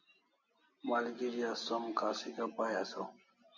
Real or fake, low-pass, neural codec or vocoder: real; 7.2 kHz; none